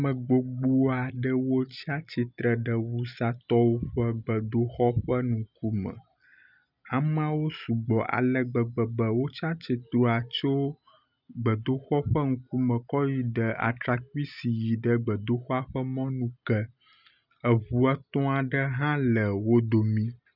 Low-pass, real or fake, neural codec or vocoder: 5.4 kHz; real; none